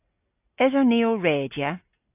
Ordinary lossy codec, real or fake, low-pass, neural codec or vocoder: AAC, 24 kbps; real; 3.6 kHz; none